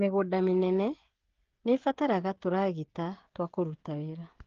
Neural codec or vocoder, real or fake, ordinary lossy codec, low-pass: codec, 16 kHz, 16 kbps, FreqCodec, smaller model; fake; Opus, 16 kbps; 7.2 kHz